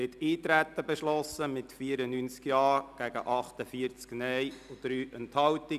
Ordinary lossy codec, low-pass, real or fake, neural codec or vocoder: none; 14.4 kHz; real; none